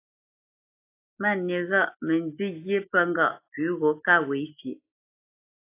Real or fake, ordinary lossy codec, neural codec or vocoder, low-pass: real; AAC, 24 kbps; none; 3.6 kHz